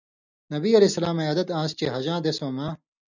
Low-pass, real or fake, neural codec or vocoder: 7.2 kHz; real; none